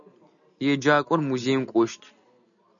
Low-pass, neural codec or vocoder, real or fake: 7.2 kHz; none; real